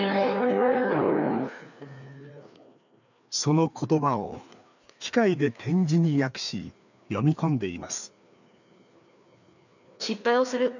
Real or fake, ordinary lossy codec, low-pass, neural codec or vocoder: fake; none; 7.2 kHz; codec, 16 kHz, 2 kbps, FreqCodec, larger model